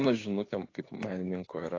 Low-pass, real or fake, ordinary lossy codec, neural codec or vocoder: 7.2 kHz; fake; AAC, 32 kbps; codec, 16 kHz, 6 kbps, DAC